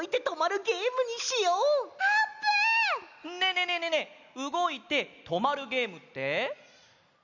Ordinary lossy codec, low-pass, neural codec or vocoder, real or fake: none; 7.2 kHz; none; real